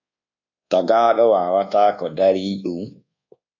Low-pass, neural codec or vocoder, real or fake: 7.2 kHz; codec, 24 kHz, 1.2 kbps, DualCodec; fake